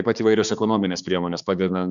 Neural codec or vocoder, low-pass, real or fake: codec, 16 kHz, 4 kbps, X-Codec, HuBERT features, trained on balanced general audio; 7.2 kHz; fake